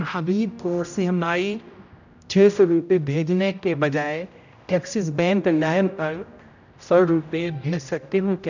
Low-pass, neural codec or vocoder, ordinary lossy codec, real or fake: 7.2 kHz; codec, 16 kHz, 0.5 kbps, X-Codec, HuBERT features, trained on general audio; none; fake